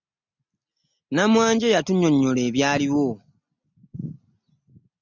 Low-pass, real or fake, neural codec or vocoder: 7.2 kHz; real; none